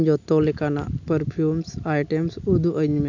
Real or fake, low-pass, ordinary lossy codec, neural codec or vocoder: real; 7.2 kHz; none; none